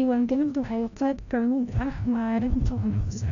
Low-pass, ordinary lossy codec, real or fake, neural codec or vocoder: 7.2 kHz; none; fake; codec, 16 kHz, 0.5 kbps, FreqCodec, larger model